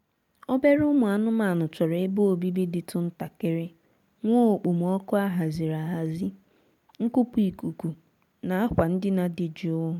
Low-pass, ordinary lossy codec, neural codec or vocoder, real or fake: 19.8 kHz; MP3, 96 kbps; none; real